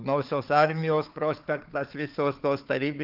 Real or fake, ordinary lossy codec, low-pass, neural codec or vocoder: fake; Opus, 24 kbps; 5.4 kHz; codec, 16 kHz, 4 kbps, FunCodec, trained on Chinese and English, 50 frames a second